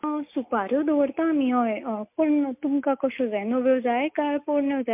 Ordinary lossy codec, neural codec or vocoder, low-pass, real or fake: MP3, 32 kbps; none; 3.6 kHz; real